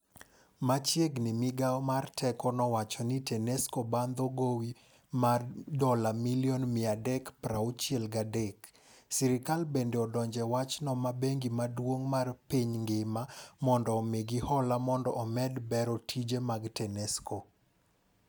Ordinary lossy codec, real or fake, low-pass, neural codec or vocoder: none; real; none; none